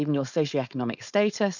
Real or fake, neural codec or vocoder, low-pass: fake; codec, 16 kHz, 4.8 kbps, FACodec; 7.2 kHz